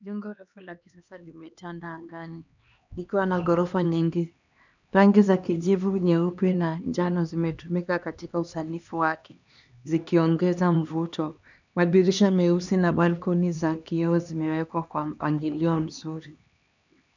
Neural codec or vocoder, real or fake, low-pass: codec, 16 kHz, 2 kbps, X-Codec, HuBERT features, trained on LibriSpeech; fake; 7.2 kHz